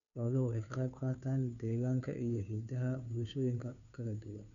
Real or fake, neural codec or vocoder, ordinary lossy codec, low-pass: fake; codec, 16 kHz, 2 kbps, FunCodec, trained on Chinese and English, 25 frames a second; none; 7.2 kHz